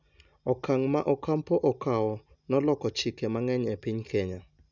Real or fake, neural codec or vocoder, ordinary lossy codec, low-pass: real; none; none; 7.2 kHz